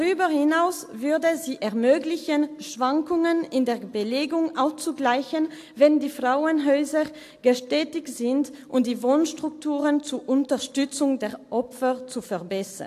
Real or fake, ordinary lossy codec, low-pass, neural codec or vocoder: real; AAC, 64 kbps; 14.4 kHz; none